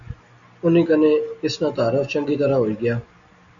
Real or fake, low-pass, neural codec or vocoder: real; 7.2 kHz; none